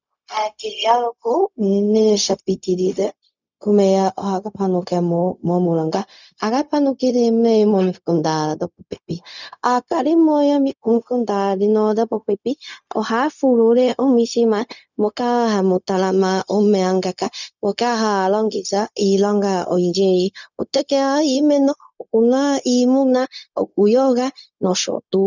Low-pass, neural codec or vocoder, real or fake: 7.2 kHz; codec, 16 kHz, 0.4 kbps, LongCat-Audio-Codec; fake